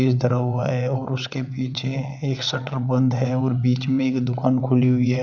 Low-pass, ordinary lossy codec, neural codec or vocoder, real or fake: 7.2 kHz; none; vocoder, 22.05 kHz, 80 mel bands, WaveNeXt; fake